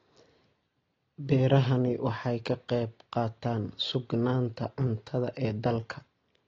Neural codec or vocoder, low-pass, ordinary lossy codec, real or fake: none; 7.2 kHz; AAC, 32 kbps; real